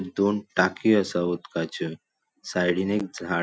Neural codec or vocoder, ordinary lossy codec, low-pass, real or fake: none; none; none; real